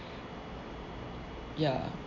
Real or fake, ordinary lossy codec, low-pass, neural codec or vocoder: real; none; 7.2 kHz; none